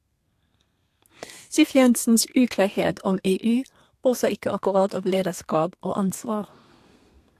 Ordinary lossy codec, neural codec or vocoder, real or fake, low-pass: AAC, 64 kbps; codec, 32 kHz, 1.9 kbps, SNAC; fake; 14.4 kHz